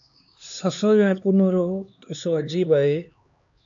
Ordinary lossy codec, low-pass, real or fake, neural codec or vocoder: AAC, 64 kbps; 7.2 kHz; fake; codec, 16 kHz, 2 kbps, X-Codec, HuBERT features, trained on LibriSpeech